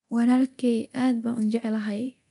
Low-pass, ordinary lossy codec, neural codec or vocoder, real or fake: 10.8 kHz; none; codec, 24 kHz, 0.9 kbps, DualCodec; fake